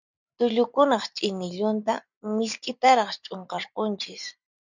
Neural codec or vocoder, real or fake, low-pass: none; real; 7.2 kHz